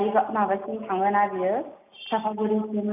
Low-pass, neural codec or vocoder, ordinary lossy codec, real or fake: 3.6 kHz; none; none; real